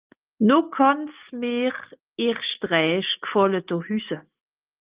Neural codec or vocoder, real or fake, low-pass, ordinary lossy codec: none; real; 3.6 kHz; Opus, 32 kbps